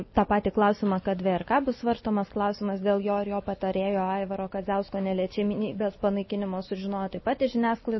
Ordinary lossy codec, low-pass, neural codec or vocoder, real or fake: MP3, 24 kbps; 7.2 kHz; none; real